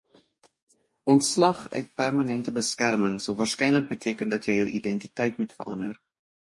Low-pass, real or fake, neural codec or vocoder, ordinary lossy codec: 10.8 kHz; fake; codec, 44.1 kHz, 2.6 kbps, DAC; MP3, 48 kbps